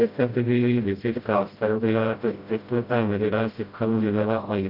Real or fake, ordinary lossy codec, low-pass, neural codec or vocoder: fake; Opus, 24 kbps; 5.4 kHz; codec, 16 kHz, 0.5 kbps, FreqCodec, smaller model